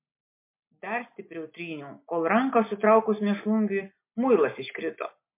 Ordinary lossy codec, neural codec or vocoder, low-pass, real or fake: MP3, 24 kbps; none; 3.6 kHz; real